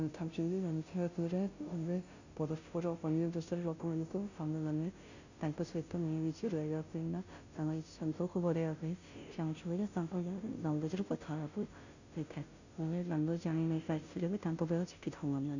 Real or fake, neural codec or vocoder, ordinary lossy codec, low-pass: fake; codec, 16 kHz, 0.5 kbps, FunCodec, trained on Chinese and English, 25 frames a second; none; 7.2 kHz